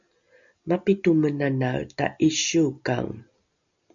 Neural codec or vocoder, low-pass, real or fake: none; 7.2 kHz; real